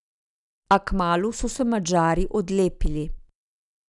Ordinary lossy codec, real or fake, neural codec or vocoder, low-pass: none; real; none; 10.8 kHz